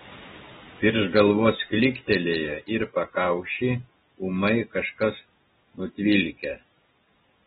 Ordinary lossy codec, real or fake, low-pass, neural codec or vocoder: AAC, 16 kbps; real; 10.8 kHz; none